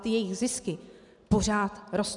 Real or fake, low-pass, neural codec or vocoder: real; 10.8 kHz; none